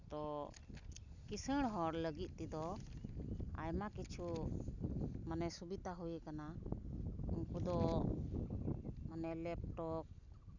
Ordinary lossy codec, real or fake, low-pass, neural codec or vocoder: none; real; 7.2 kHz; none